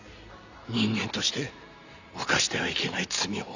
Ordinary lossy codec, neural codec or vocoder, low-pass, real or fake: none; none; 7.2 kHz; real